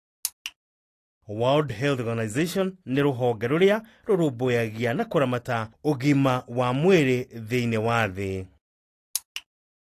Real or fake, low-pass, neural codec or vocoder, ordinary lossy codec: real; 14.4 kHz; none; AAC, 48 kbps